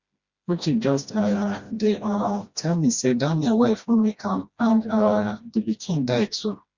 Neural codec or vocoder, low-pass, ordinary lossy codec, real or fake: codec, 16 kHz, 1 kbps, FreqCodec, smaller model; 7.2 kHz; Opus, 64 kbps; fake